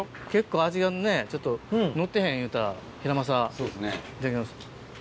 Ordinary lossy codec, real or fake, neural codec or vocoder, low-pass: none; real; none; none